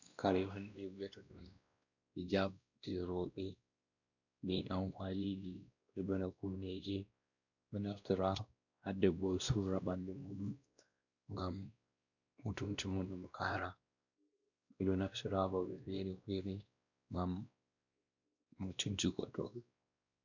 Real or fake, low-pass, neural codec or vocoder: fake; 7.2 kHz; codec, 16 kHz, 1 kbps, X-Codec, WavLM features, trained on Multilingual LibriSpeech